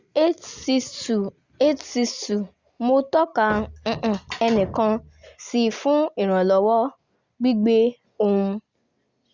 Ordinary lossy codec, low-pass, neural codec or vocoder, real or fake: Opus, 64 kbps; 7.2 kHz; none; real